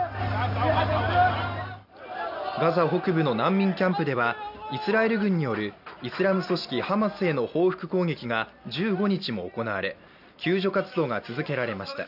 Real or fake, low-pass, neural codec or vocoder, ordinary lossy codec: real; 5.4 kHz; none; none